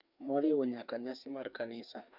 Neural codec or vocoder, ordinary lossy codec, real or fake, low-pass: codec, 16 kHz, 2 kbps, FreqCodec, larger model; none; fake; 5.4 kHz